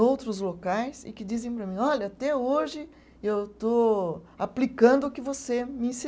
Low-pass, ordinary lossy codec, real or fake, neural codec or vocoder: none; none; real; none